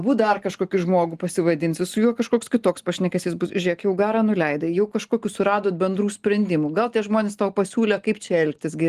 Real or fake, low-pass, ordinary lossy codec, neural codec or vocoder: real; 14.4 kHz; Opus, 24 kbps; none